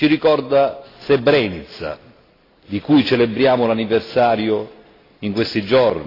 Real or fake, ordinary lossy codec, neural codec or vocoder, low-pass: real; AAC, 24 kbps; none; 5.4 kHz